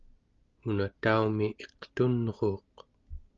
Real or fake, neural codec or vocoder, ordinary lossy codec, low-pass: real; none; Opus, 24 kbps; 7.2 kHz